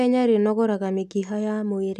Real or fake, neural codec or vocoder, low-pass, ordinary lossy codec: real; none; 14.4 kHz; none